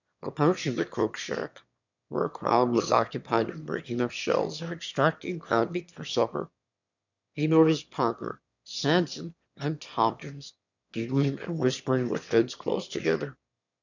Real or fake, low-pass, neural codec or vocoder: fake; 7.2 kHz; autoencoder, 22.05 kHz, a latent of 192 numbers a frame, VITS, trained on one speaker